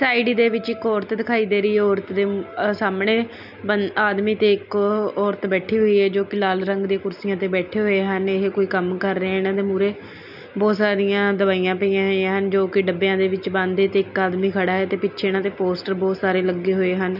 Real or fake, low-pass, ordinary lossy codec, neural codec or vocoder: real; 5.4 kHz; none; none